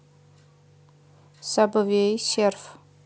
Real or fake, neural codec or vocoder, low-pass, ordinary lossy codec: real; none; none; none